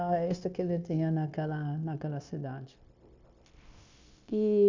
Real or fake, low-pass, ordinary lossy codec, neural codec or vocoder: fake; 7.2 kHz; MP3, 64 kbps; codec, 16 kHz, 0.9 kbps, LongCat-Audio-Codec